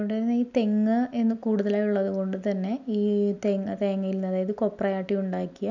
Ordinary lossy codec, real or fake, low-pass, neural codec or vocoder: none; real; 7.2 kHz; none